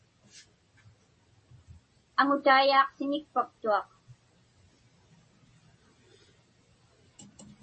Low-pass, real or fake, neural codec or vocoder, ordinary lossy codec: 10.8 kHz; real; none; MP3, 32 kbps